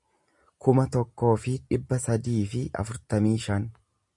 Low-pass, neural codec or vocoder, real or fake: 10.8 kHz; none; real